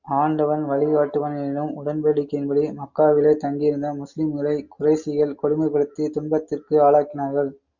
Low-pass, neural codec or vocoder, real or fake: 7.2 kHz; none; real